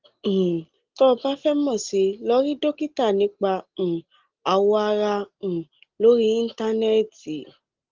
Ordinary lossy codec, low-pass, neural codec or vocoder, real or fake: Opus, 16 kbps; 7.2 kHz; none; real